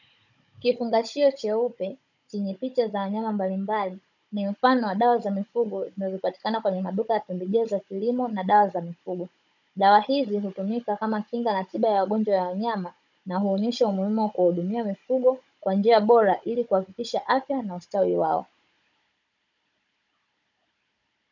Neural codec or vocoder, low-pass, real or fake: codec, 16 kHz, 16 kbps, FunCodec, trained on Chinese and English, 50 frames a second; 7.2 kHz; fake